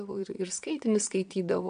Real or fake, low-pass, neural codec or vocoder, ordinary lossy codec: fake; 9.9 kHz; vocoder, 22.05 kHz, 80 mel bands, Vocos; AAC, 96 kbps